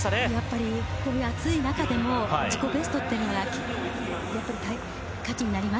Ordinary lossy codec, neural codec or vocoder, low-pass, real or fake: none; none; none; real